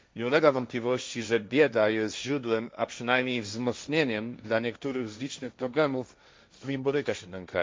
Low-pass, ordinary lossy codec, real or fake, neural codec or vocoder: none; none; fake; codec, 16 kHz, 1.1 kbps, Voila-Tokenizer